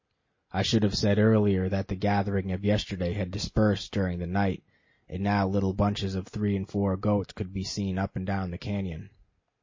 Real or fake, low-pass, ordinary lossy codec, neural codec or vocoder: real; 7.2 kHz; MP3, 32 kbps; none